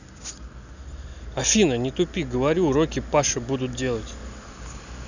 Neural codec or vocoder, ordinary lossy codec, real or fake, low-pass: none; none; real; 7.2 kHz